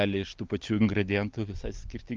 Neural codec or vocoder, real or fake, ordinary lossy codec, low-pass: none; real; Opus, 32 kbps; 7.2 kHz